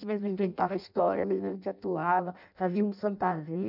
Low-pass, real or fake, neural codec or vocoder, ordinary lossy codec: 5.4 kHz; fake; codec, 16 kHz in and 24 kHz out, 0.6 kbps, FireRedTTS-2 codec; none